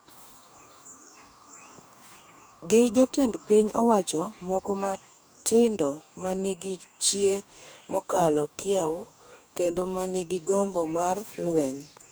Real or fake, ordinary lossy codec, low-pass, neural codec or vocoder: fake; none; none; codec, 44.1 kHz, 2.6 kbps, DAC